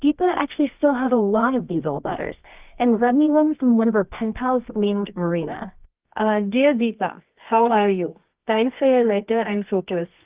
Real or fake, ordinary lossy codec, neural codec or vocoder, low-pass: fake; Opus, 24 kbps; codec, 24 kHz, 0.9 kbps, WavTokenizer, medium music audio release; 3.6 kHz